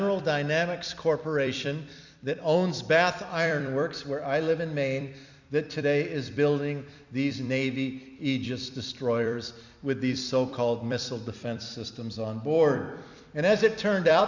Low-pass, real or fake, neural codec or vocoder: 7.2 kHz; fake; vocoder, 44.1 kHz, 128 mel bands every 256 samples, BigVGAN v2